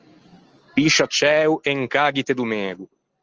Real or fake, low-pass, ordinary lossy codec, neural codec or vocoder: real; 7.2 kHz; Opus, 24 kbps; none